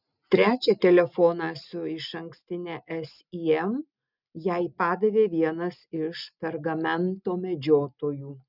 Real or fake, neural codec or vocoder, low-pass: real; none; 5.4 kHz